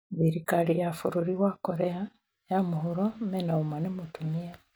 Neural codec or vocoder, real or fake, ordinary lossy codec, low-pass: none; real; none; none